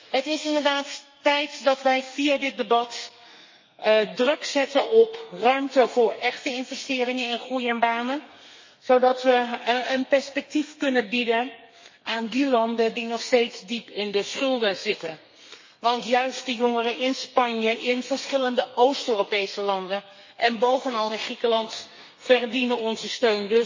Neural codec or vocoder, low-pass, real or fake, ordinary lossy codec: codec, 32 kHz, 1.9 kbps, SNAC; 7.2 kHz; fake; MP3, 32 kbps